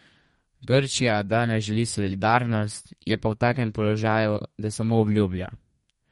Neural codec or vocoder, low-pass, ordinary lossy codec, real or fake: codec, 32 kHz, 1.9 kbps, SNAC; 14.4 kHz; MP3, 48 kbps; fake